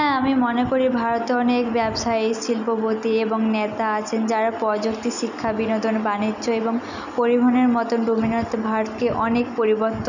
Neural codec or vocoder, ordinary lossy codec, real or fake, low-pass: none; none; real; 7.2 kHz